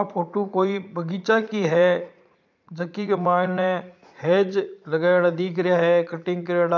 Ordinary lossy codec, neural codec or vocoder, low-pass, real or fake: none; vocoder, 44.1 kHz, 80 mel bands, Vocos; 7.2 kHz; fake